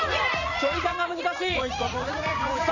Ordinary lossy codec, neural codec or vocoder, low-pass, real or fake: none; vocoder, 44.1 kHz, 80 mel bands, Vocos; 7.2 kHz; fake